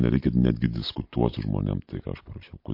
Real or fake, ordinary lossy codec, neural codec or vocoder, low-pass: real; MP3, 32 kbps; none; 5.4 kHz